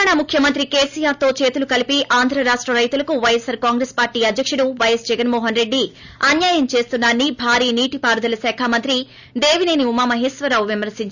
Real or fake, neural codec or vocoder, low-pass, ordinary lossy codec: real; none; 7.2 kHz; none